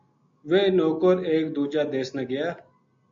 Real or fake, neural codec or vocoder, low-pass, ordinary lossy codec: real; none; 7.2 kHz; AAC, 64 kbps